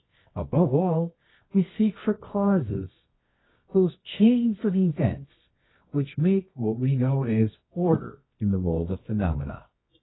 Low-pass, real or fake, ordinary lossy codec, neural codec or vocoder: 7.2 kHz; fake; AAC, 16 kbps; codec, 24 kHz, 0.9 kbps, WavTokenizer, medium music audio release